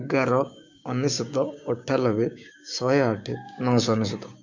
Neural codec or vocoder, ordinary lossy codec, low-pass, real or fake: codec, 16 kHz, 6 kbps, DAC; MP3, 64 kbps; 7.2 kHz; fake